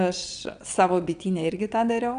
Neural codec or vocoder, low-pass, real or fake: vocoder, 22.05 kHz, 80 mel bands, WaveNeXt; 9.9 kHz; fake